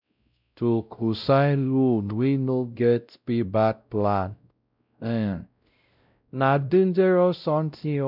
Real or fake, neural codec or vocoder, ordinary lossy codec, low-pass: fake; codec, 16 kHz, 0.5 kbps, X-Codec, WavLM features, trained on Multilingual LibriSpeech; none; 5.4 kHz